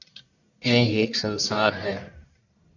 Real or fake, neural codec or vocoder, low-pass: fake; codec, 44.1 kHz, 1.7 kbps, Pupu-Codec; 7.2 kHz